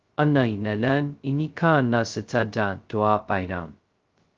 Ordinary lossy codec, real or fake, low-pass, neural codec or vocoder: Opus, 32 kbps; fake; 7.2 kHz; codec, 16 kHz, 0.2 kbps, FocalCodec